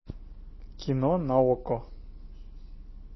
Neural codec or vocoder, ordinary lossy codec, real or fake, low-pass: none; MP3, 24 kbps; real; 7.2 kHz